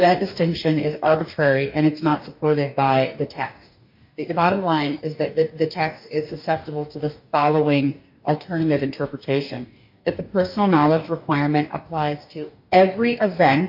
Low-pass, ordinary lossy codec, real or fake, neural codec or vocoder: 5.4 kHz; MP3, 48 kbps; fake; codec, 44.1 kHz, 2.6 kbps, DAC